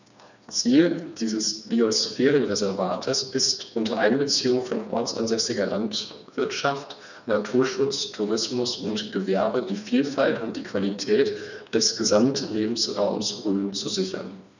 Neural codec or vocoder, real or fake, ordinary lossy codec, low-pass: codec, 16 kHz, 2 kbps, FreqCodec, smaller model; fake; none; 7.2 kHz